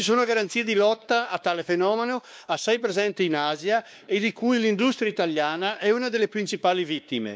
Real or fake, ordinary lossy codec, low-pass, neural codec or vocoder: fake; none; none; codec, 16 kHz, 2 kbps, X-Codec, WavLM features, trained on Multilingual LibriSpeech